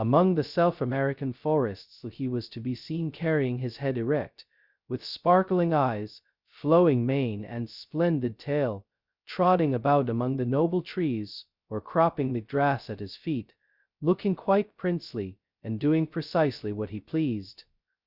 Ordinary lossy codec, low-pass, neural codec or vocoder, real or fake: Opus, 64 kbps; 5.4 kHz; codec, 16 kHz, 0.2 kbps, FocalCodec; fake